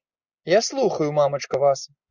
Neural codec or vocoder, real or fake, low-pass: none; real; 7.2 kHz